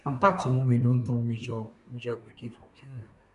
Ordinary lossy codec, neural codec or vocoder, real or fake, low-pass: none; codec, 24 kHz, 1 kbps, SNAC; fake; 10.8 kHz